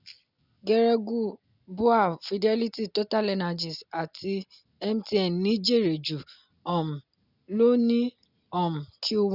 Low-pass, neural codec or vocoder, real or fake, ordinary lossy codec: 5.4 kHz; none; real; none